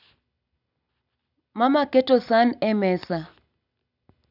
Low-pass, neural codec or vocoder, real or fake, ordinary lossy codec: 5.4 kHz; none; real; none